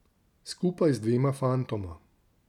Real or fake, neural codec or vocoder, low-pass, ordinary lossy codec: real; none; 19.8 kHz; none